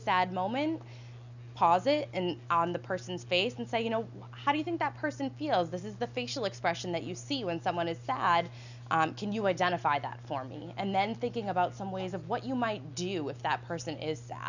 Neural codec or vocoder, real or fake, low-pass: none; real; 7.2 kHz